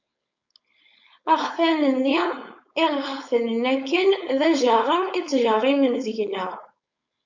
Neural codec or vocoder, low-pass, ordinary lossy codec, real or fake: codec, 16 kHz, 4.8 kbps, FACodec; 7.2 kHz; MP3, 48 kbps; fake